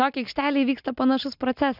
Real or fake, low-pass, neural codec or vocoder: real; 5.4 kHz; none